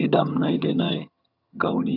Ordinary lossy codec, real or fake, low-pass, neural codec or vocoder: none; fake; 5.4 kHz; vocoder, 22.05 kHz, 80 mel bands, HiFi-GAN